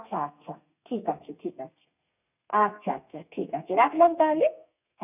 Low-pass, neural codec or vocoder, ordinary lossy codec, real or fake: 3.6 kHz; codec, 32 kHz, 1.9 kbps, SNAC; none; fake